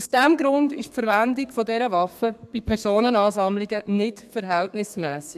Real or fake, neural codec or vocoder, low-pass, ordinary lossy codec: fake; codec, 32 kHz, 1.9 kbps, SNAC; 14.4 kHz; AAC, 96 kbps